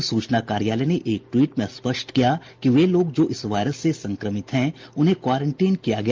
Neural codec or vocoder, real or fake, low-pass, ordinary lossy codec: none; real; 7.2 kHz; Opus, 24 kbps